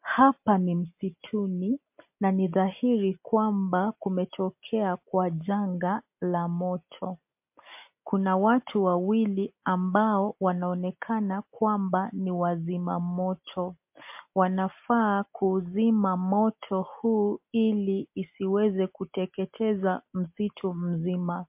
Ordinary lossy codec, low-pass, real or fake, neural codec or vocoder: MP3, 32 kbps; 3.6 kHz; real; none